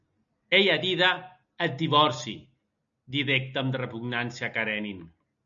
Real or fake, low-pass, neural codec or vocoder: real; 7.2 kHz; none